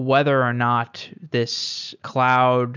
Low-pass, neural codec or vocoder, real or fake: 7.2 kHz; none; real